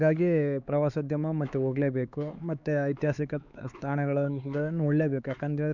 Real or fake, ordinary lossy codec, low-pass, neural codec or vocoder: fake; none; 7.2 kHz; codec, 16 kHz, 4 kbps, X-Codec, HuBERT features, trained on LibriSpeech